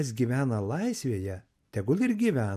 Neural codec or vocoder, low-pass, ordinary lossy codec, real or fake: none; 14.4 kHz; MP3, 96 kbps; real